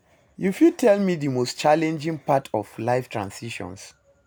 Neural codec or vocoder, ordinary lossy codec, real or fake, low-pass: none; none; real; none